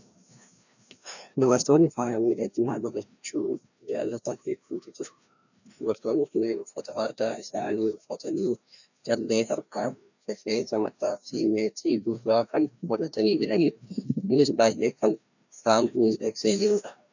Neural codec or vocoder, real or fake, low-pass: codec, 16 kHz, 1 kbps, FreqCodec, larger model; fake; 7.2 kHz